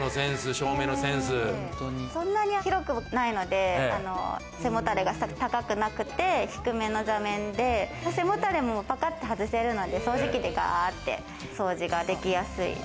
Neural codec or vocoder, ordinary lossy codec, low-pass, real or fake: none; none; none; real